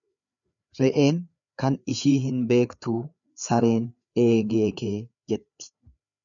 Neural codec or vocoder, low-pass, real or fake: codec, 16 kHz, 4 kbps, FreqCodec, larger model; 7.2 kHz; fake